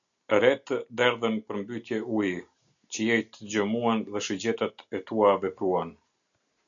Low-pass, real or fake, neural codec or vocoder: 7.2 kHz; real; none